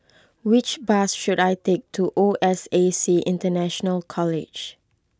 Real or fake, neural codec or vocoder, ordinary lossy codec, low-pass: real; none; none; none